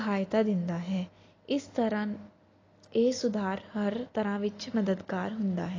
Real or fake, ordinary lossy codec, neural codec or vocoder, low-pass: real; AAC, 32 kbps; none; 7.2 kHz